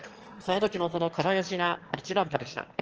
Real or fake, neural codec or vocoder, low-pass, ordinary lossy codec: fake; autoencoder, 22.05 kHz, a latent of 192 numbers a frame, VITS, trained on one speaker; 7.2 kHz; Opus, 16 kbps